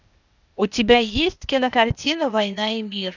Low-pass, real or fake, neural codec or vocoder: 7.2 kHz; fake; codec, 16 kHz, 0.8 kbps, ZipCodec